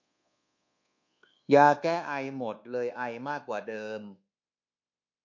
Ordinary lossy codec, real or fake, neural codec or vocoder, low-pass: MP3, 48 kbps; fake; codec, 24 kHz, 1.2 kbps, DualCodec; 7.2 kHz